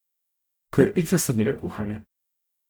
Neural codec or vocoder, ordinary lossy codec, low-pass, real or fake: codec, 44.1 kHz, 0.9 kbps, DAC; none; none; fake